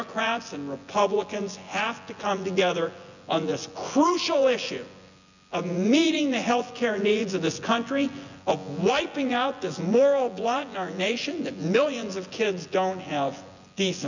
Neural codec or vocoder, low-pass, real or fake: vocoder, 24 kHz, 100 mel bands, Vocos; 7.2 kHz; fake